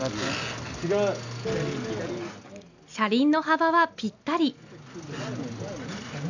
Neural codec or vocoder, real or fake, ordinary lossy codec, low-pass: none; real; none; 7.2 kHz